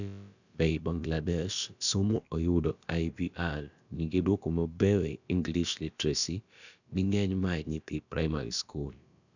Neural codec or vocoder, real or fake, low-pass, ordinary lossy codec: codec, 16 kHz, about 1 kbps, DyCAST, with the encoder's durations; fake; 7.2 kHz; none